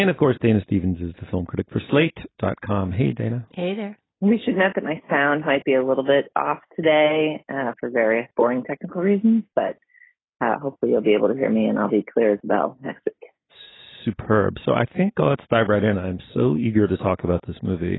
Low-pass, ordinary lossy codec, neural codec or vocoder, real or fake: 7.2 kHz; AAC, 16 kbps; none; real